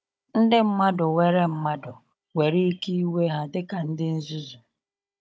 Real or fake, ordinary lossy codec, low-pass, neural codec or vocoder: fake; none; none; codec, 16 kHz, 16 kbps, FunCodec, trained on Chinese and English, 50 frames a second